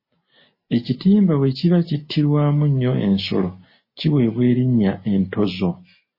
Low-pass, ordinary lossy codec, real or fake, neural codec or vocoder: 5.4 kHz; MP3, 24 kbps; real; none